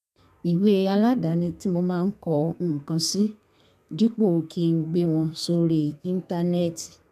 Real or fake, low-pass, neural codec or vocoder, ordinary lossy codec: fake; 14.4 kHz; codec, 32 kHz, 1.9 kbps, SNAC; none